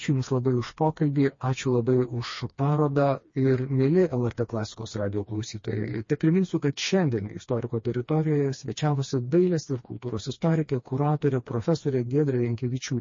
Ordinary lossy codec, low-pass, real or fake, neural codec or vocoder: MP3, 32 kbps; 7.2 kHz; fake; codec, 16 kHz, 2 kbps, FreqCodec, smaller model